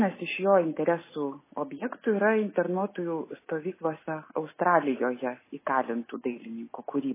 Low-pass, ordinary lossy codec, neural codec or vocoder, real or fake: 3.6 kHz; MP3, 16 kbps; none; real